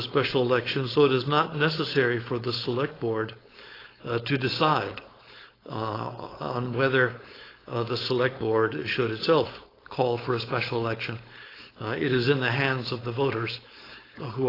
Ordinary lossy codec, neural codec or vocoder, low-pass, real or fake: AAC, 24 kbps; codec, 16 kHz, 4.8 kbps, FACodec; 5.4 kHz; fake